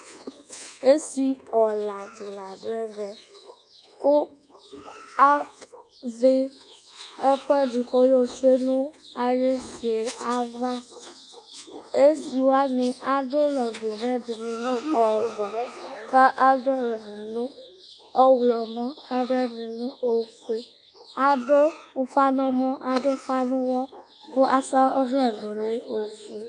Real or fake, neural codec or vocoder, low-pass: fake; codec, 24 kHz, 1.2 kbps, DualCodec; 10.8 kHz